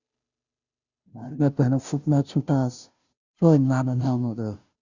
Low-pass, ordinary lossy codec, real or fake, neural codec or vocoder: 7.2 kHz; Opus, 64 kbps; fake; codec, 16 kHz, 0.5 kbps, FunCodec, trained on Chinese and English, 25 frames a second